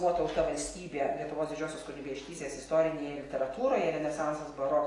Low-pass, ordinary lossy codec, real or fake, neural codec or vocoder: 10.8 kHz; AAC, 32 kbps; real; none